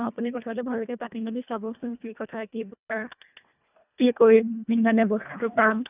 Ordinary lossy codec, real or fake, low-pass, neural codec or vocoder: none; fake; 3.6 kHz; codec, 24 kHz, 1.5 kbps, HILCodec